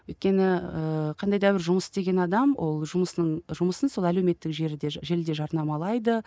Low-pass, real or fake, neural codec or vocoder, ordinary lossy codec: none; real; none; none